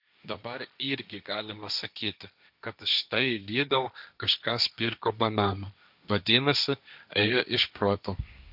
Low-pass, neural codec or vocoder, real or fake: 5.4 kHz; codec, 16 kHz, 1.1 kbps, Voila-Tokenizer; fake